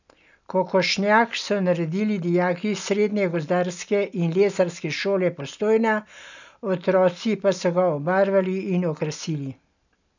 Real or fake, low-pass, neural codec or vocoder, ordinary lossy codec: real; 7.2 kHz; none; none